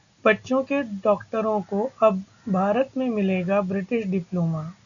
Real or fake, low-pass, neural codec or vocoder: real; 7.2 kHz; none